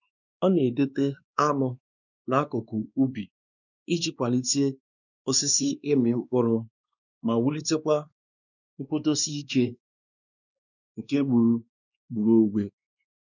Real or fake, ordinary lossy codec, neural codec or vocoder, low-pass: fake; none; codec, 16 kHz, 2 kbps, X-Codec, WavLM features, trained on Multilingual LibriSpeech; 7.2 kHz